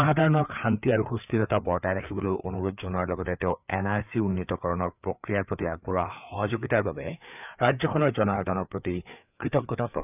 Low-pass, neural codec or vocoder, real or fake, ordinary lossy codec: 3.6 kHz; codec, 16 kHz, 4 kbps, FreqCodec, larger model; fake; none